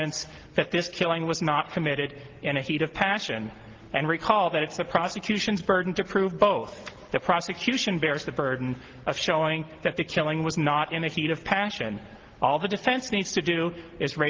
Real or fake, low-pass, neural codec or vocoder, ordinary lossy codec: real; 7.2 kHz; none; Opus, 16 kbps